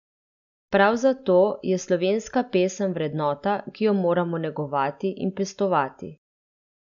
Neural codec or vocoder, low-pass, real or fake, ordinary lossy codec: none; 7.2 kHz; real; none